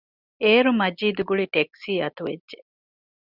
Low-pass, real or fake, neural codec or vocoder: 5.4 kHz; real; none